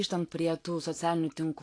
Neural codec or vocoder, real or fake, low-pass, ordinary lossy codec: vocoder, 24 kHz, 100 mel bands, Vocos; fake; 9.9 kHz; AAC, 48 kbps